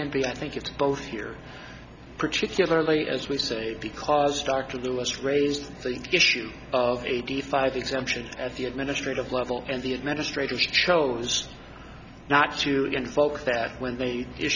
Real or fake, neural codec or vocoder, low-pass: real; none; 7.2 kHz